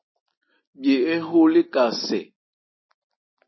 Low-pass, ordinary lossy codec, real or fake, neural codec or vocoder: 7.2 kHz; MP3, 24 kbps; real; none